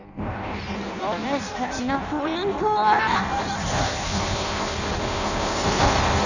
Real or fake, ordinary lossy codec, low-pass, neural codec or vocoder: fake; none; 7.2 kHz; codec, 16 kHz in and 24 kHz out, 0.6 kbps, FireRedTTS-2 codec